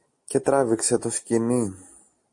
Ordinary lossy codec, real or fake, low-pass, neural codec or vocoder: MP3, 48 kbps; real; 10.8 kHz; none